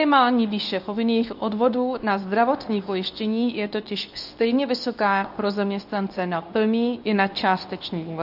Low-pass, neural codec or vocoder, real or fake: 5.4 kHz; codec, 24 kHz, 0.9 kbps, WavTokenizer, medium speech release version 2; fake